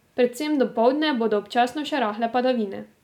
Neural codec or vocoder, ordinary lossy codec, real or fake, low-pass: none; none; real; 19.8 kHz